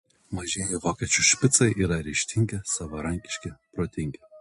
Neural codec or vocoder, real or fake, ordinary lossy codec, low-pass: none; real; MP3, 48 kbps; 14.4 kHz